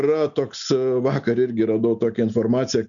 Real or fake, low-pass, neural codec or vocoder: real; 7.2 kHz; none